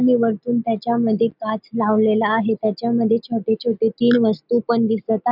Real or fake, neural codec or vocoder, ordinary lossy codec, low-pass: real; none; none; 5.4 kHz